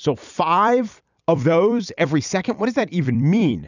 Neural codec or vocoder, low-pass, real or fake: vocoder, 44.1 kHz, 128 mel bands every 256 samples, BigVGAN v2; 7.2 kHz; fake